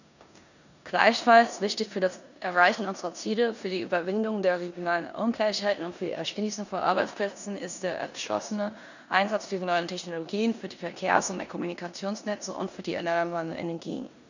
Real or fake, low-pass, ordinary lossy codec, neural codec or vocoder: fake; 7.2 kHz; none; codec, 16 kHz in and 24 kHz out, 0.9 kbps, LongCat-Audio-Codec, four codebook decoder